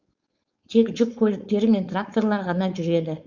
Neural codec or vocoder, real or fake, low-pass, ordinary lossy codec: codec, 16 kHz, 4.8 kbps, FACodec; fake; 7.2 kHz; Opus, 64 kbps